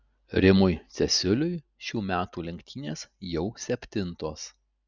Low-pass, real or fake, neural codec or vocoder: 7.2 kHz; real; none